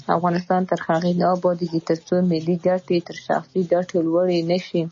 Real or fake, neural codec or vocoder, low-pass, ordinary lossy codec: real; none; 7.2 kHz; MP3, 32 kbps